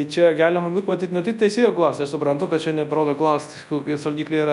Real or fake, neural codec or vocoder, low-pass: fake; codec, 24 kHz, 0.9 kbps, WavTokenizer, large speech release; 10.8 kHz